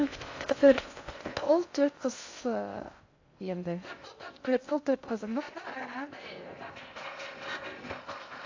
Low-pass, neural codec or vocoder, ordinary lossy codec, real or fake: 7.2 kHz; codec, 16 kHz in and 24 kHz out, 0.6 kbps, FocalCodec, streaming, 2048 codes; AAC, 48 kbps; fake